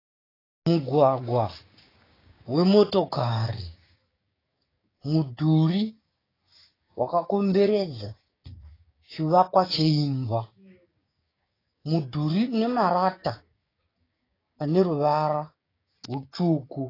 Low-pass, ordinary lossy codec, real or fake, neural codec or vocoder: 5.4 kHz; AAC, 24 kbps; fake; codec, 16 kHz, 6 kbps, DAC